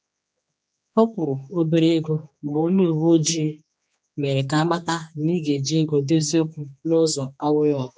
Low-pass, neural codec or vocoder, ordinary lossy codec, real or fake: none; codec, 16 kHz, 2 kbps, X-Codec, HuBERT features, trained on general audio; none; fake